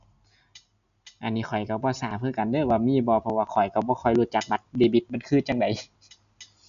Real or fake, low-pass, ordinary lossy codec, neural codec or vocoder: real; 7.2 kHz; none; none